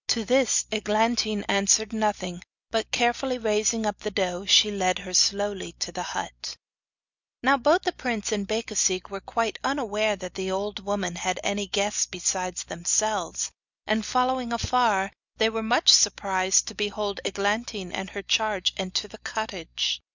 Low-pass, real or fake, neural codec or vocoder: 7.2 kHz; real; none